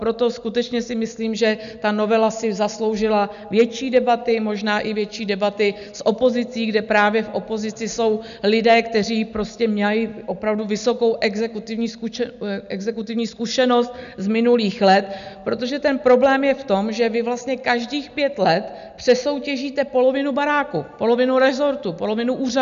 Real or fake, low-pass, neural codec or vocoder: real; 7.2 kHz; none